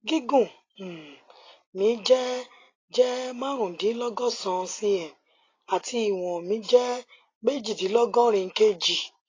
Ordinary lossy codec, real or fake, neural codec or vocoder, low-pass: AAC, 32 kbps; real; none; 7.2 kHz